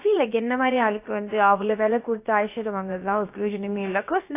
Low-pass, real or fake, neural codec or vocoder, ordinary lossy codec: 3.6 kHz; fake; codec, 16 kHz, about 1 kbps, DyCAST, with the encoder's durations; AAC, 24 kbps